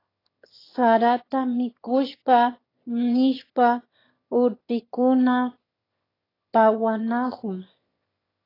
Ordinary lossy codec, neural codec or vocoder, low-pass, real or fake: AAC, 24 kbps; autoencoder, 22.05 kHz, a latent of 192 numbers a frame, VITS, trained on one speaker; 5.4 kHz; fake